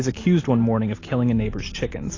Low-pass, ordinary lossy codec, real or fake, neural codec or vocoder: 7.2 kHz; AAC, 32 kbps; real; none